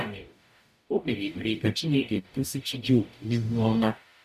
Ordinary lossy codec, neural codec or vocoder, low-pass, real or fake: none; codec, 44.1 kHz, 0.9 kbps, DAC; 14.4 kHz; fake